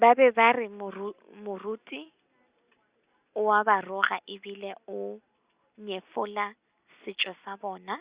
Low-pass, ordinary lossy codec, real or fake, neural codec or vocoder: 3.6 kHz; Opus, 64 kbps; real; none